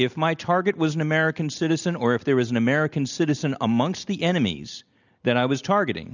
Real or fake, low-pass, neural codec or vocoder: real; 7.2 kHz; none